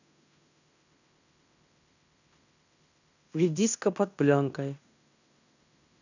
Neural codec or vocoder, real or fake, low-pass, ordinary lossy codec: codec, 16 kHz in and 24 kHz out, 0.9 kbps, LongCat-Audio-Codec, fine tuned four codebook decoder; fake; 7.2 kHz; none